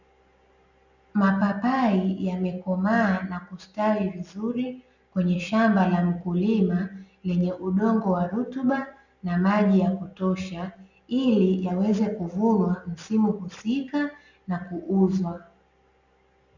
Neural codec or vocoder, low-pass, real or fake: none; 7.2 kHz; real